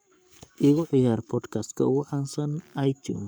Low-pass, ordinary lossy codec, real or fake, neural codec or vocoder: none; none; fake; codec, 44.1 kHz, 7.8 kbps, Pupu-Codec